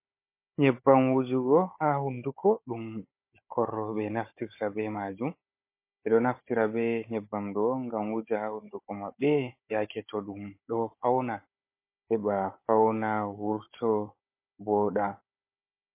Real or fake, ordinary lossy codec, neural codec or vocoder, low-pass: fake; MP3, 24 kbps; codec, 16 kHz, 16 kbps, FunCodec, trained on Chinese and English, 50 frames a second; 3.6 kHz